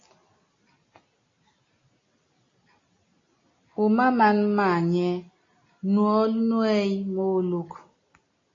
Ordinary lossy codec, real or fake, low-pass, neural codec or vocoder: AAC, 32 kbps; real; 7.2 kHz; none